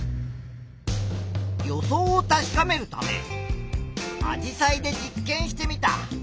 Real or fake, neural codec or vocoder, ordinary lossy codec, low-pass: real; none; none; none